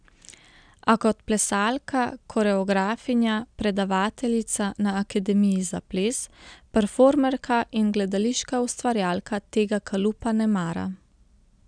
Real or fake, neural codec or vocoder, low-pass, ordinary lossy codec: real; none; 9.9 kHz; none